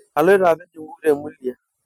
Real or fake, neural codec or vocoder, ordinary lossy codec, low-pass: real; none; none; 19.8 kHz